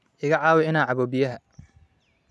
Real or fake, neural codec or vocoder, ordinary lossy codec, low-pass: real; none; none; none